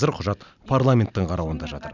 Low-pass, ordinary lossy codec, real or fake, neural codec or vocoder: 7.2 kHz; none; real; none